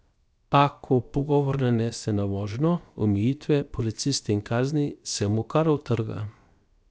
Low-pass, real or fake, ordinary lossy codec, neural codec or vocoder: none; fake; none; codec, 16 kHz, 0.7 kbps, FocalCodec